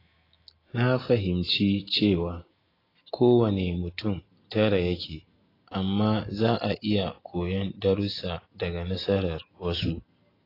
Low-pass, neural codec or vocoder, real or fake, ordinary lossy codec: 5.4 kHz; none; real; AAC, 24 kbps